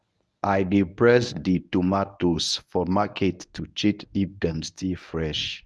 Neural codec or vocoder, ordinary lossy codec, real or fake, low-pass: codec, 24 kHz, 0.9 kbps, WavTokenizer, medium speech release version 1; none; fake; none